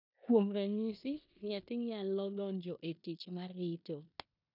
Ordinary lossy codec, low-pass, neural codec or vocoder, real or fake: none; 5.4 kHz; codec, 16 kHz in and 24 kHz out, 0.9 kbps, LongCat-Audio-Codec, four codebook decoder; fake